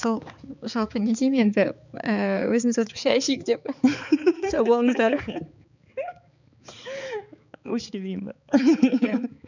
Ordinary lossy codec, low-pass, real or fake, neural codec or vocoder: none; 7.2 kHz; fake; codec, 16 kHz, 4 kbps, X-Codec, HuBERT features, trained on balanced general audio